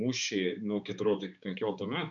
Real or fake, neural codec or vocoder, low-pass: fake; codec, 16 kHz, 4 kbps, X-Codec, HuBERT features, trained on balanced general audio; 7.2 kHz